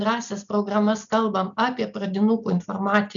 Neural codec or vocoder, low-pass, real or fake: none; 7.2 kHz; real